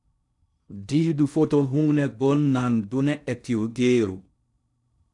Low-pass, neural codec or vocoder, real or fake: 10.8 kHz; codec, 16 kHz in and 24 kHz out, 0.6 kbps, FocalCodec, streaming, 4096 codes; fake